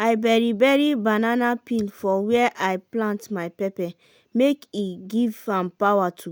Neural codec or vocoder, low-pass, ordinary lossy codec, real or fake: none; none; none; real